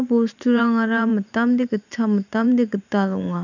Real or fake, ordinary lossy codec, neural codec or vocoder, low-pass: fake; none; vocoder, 44.1 kHz, 128 mel bands every 512 samples, BigVGAN v2; 7.2 kHz